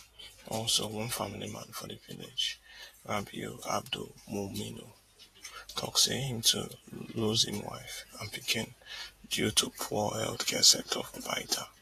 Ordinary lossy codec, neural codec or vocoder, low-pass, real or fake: AAC, 48 kbps; vocoder, 48 kHz, 128 mel bands, Vocos; 14.4 kHz; fake